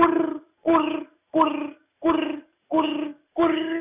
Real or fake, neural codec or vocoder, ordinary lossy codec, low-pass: real; none; none; 3.6 kHz